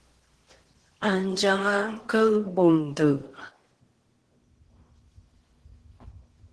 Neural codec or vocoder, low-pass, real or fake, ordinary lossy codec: codec, 16 kHz in and 24 kHz out, 0.8 kbps, FocalCodec, streaming, 65536 codes; 10.8 kHz; fake; Opus, 16 kbps